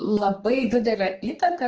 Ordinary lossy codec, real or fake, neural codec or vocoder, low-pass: Opus, 16 kbps; fake; codec, 16 kHz, 2 kbps, X-Codec, HuBERT features, trained on balanced general audio; 7.2 kHz